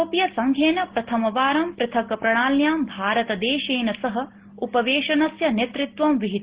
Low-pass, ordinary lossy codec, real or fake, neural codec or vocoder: 3.6 kHz; Opus, 16 kbps; real; none